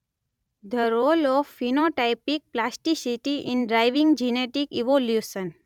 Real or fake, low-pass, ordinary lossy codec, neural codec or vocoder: fake; 19.8 kHz; none; vocoder, 44.1 kHz, 128 mel bands every 512 samples, BigVGAN v2